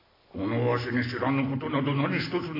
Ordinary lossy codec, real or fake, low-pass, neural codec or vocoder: AAC, 24 kbps; real; 5.4 kHz; none